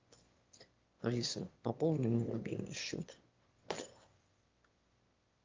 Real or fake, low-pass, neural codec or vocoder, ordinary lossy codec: fake; 7.2 kHz; autoencoder, 22.05 kHz, a latent of 192 numbers a frame, VITS, trained on one speaker; Opus, 32 kbps